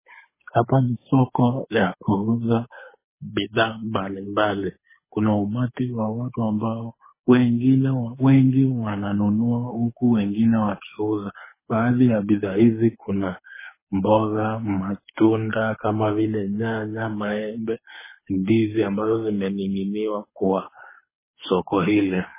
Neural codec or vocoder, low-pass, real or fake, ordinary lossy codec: codec, 24 kHz, 6 kbps, HILCodec; 3.6 kHz; fake; MP3, 16 kbps